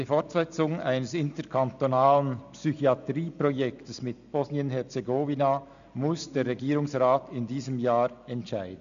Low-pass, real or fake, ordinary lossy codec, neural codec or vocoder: 7.2 kHz; real; none; none